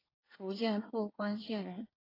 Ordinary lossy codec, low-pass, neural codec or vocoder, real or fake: AAC, 24 kbps; 5.4 kHz; codec, 24 kHz, 1 kbps, SNAC; fake